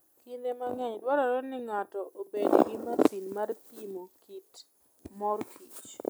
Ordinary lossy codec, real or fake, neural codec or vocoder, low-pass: none; real; none; none